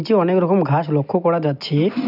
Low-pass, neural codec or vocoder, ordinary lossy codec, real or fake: 5.4 kHz; none; none; real